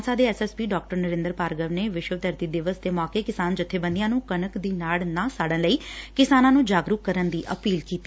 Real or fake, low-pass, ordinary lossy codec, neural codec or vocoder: real; none; none; none